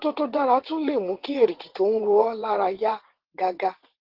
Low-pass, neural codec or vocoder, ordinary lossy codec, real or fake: 5.4 kHz; vocoder, 22.05 kHz, 80 mel bands, WaveNeXt; Opus, 16 kbps; fake